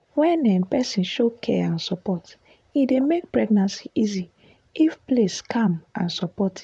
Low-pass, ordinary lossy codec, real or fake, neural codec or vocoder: 10.8 kHz; none; fake; vocoder, 44.1 kHz, 128 mel bands, Pupu-Vocoder